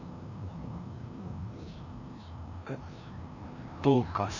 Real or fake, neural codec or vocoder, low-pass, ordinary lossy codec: fake; codec, 16 kHz, 1 kbps, FreqCodec, larger model; 7.2 kHz; none